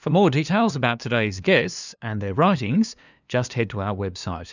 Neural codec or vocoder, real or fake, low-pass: codec, 16 kHz, 2 kbps, FunCodec, trained on LibriTTS, 25 frames a second; fake; 7.2 kHz